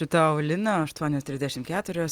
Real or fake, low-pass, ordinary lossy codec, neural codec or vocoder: real; 19.8 kHz; Opus, 24 kbps; none